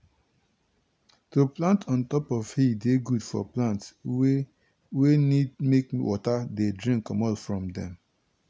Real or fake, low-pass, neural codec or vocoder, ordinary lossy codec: real; none; none; none